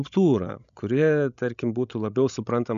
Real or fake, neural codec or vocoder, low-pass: fake; codec, 16 kHz, 16 kbps, FreqCodec, larger model; 7.2 kHz